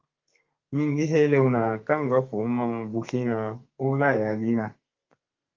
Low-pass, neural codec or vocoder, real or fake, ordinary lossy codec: 7.2 kHz; codec, 32 kHz, 1.9 kbps, SNAC; fake; Opus, 32 kbps